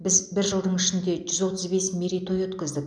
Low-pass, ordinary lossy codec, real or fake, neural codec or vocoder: 9.9 kHz; none; real; none